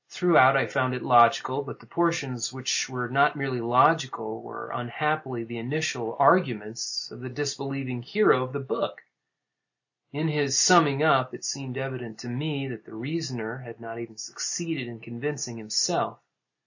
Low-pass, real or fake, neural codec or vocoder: 7.2 kHz; real; none